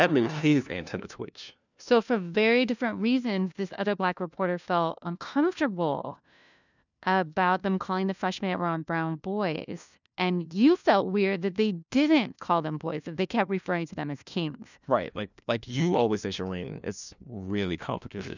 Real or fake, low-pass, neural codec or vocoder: fake; 7.2 kHz; codec, 16 kHz, 1 kbps, FunCodec, trained on LibriTTS, 50 frames a second